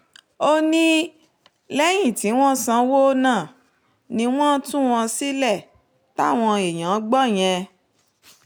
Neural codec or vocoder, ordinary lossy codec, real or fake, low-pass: none; none; real; none